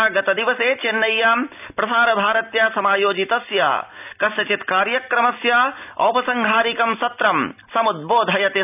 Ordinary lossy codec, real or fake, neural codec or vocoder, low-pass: none; real; none; 3.6 kHz